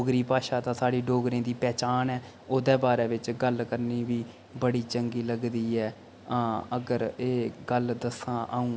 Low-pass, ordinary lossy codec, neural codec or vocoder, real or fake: none; none; none; real